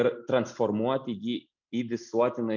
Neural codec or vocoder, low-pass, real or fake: none; 7.2 kHz; real